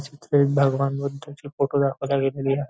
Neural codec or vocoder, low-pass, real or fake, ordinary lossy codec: none; none; real; none